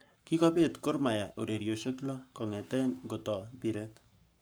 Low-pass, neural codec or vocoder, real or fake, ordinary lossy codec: none; codec, 44.1 kHz, 7.8 kbps, Pupu-Codec; fake; none